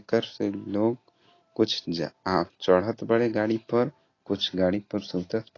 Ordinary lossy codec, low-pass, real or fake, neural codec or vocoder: AAC, 32 kbps; 7.2 kHz; real; none